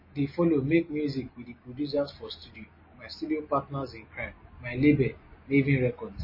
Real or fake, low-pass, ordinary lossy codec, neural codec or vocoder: real; 5.4 kHz; MP3, 24 kbps; none